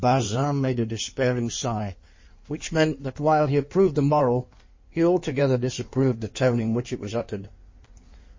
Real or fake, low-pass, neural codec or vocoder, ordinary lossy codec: fake; 7.2 kHz; codec, 16 kHz in and 24 kHz out, 1.1 kbps, FireRedTTS-2 codec; MP3, 32 kbps